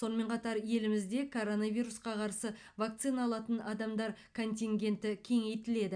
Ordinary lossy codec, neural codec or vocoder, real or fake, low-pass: none; none; real; 9.9 kHz